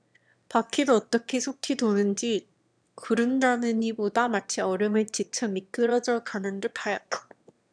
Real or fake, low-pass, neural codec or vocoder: fake; 9.9 kHz; autoencoder, 22.05 kHz, a latent of 192 numbers a frame, VITS, trained on one speaker